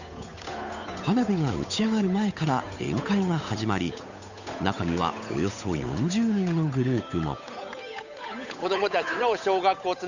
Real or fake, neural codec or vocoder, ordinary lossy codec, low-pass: fake; codec, 16 kHz, 8 kbps, FunCodec, trained on Chinese and English, 25 frames a second; none; 7.2 kHz